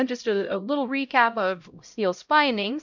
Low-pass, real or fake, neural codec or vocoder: 7.2 kHz; fake; codec, 16 kHz, 0.5 kbps, X-Codec, HuBERT features, trained on LibriSpeech